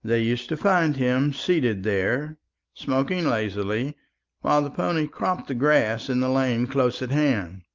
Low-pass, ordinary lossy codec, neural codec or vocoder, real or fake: 7.2 kHz; Opus, 24 kbps; none; real